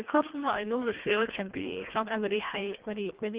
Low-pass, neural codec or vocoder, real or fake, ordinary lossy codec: 3.6 kHz; codec, 24 kHz, 1.5 kbps, HILCodec; fake; Opus, 16 kbps